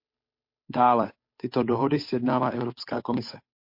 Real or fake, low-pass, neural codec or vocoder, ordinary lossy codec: fake; 5.4 kHz; codec, 16 kHz, 8 kbps, FunCodec, trained on Chinese and English, 25 frames a second; MP3, 32 kbps